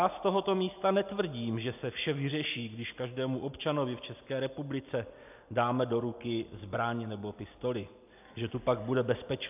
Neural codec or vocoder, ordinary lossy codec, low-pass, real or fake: none; AAC, 32 kbps; 3.6 kHz; real